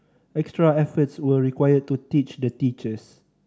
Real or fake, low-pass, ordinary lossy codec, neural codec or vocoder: real; none; none; none